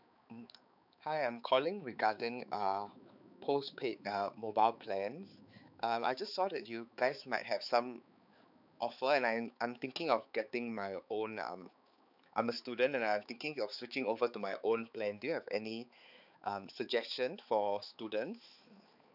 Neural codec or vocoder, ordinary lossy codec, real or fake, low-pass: codec, 16 kHz, 4 kbps, X-Codec, HuBERT features, trained on balanced general audio; none; fake; 5.4 kHz